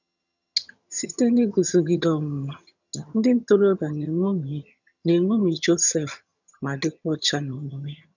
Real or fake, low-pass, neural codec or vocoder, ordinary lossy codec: fake; 7.2 kHz; vocoder, 22.05 kHz, 80 mel bands, HiFi-GAN; none